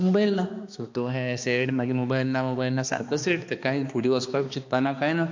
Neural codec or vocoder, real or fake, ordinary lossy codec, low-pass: codec, 16 kHz, 2 kbps, X-Codec, HuBERT features, trained on balanced general audio; fake; MP3, 48 kbps; 7.2 kHz